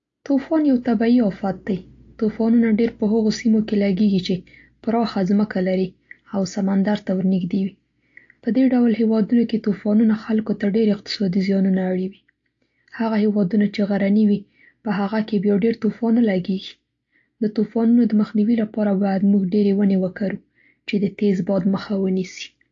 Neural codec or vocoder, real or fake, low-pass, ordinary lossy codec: none; real; 7.2 kHz; AAC, 48 kbps